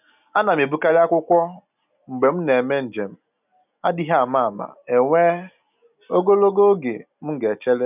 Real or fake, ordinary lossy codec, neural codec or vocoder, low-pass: real; none; none; 3.6 kHz